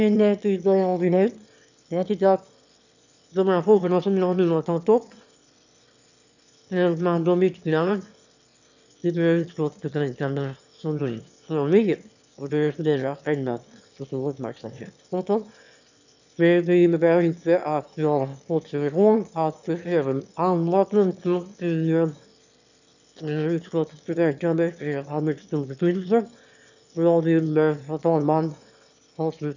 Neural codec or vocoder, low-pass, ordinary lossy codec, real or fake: autoencoder, 22.05 kHz, a latent of 192 numbers a frame, VITS, trained on one speaker; 7.2 kHz; none; fake